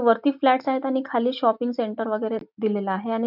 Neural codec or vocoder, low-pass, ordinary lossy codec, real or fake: none; 5.4 kHz; none; real